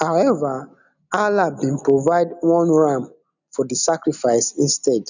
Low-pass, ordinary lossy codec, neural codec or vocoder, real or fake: 7.2 kHz; none; none; real